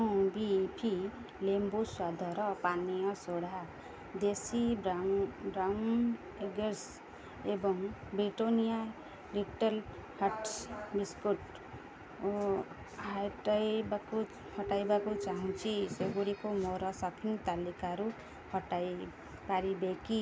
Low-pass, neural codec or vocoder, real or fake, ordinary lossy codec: none; none; real; none